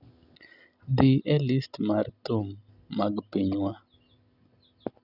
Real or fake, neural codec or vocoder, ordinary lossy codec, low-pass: real; none; none; 5.4 kHz